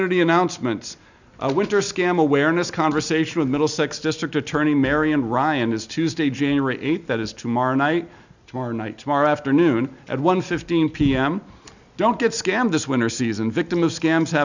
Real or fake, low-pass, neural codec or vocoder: real; 7.2 kHz; none